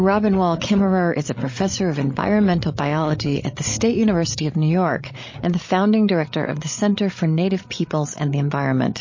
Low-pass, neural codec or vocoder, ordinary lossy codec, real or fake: 7.2 kHz; codec, 16 kHz, 16 kbps, FreqCodec, larger model; MP3, 32 kbps; fake